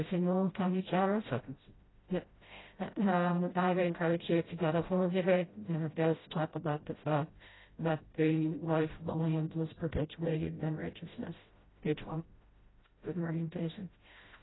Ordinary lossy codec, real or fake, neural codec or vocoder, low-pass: AAC, 16 kbps; fake; codec, 16 kHz, 0.5 kbps, FreqCodec, smaller model; 7.2 kHz